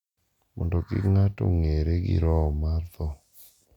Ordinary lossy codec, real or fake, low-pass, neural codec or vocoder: none; real; 19.8 kHz; none